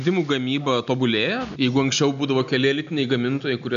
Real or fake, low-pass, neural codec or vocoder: real; 7.2 kHz; none